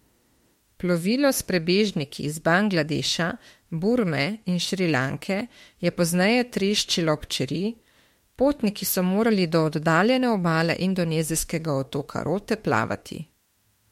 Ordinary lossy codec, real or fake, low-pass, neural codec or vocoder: MP3, 64 kbps; fake; 19.8 kHz; autoencoder, 48 kHz, 32 numbers a frame, DAC-VAE, trained on Japanese speech